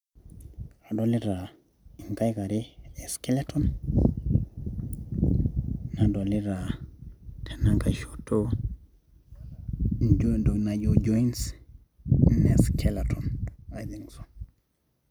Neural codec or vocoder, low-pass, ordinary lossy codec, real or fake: none; 19.8 kHz; none; real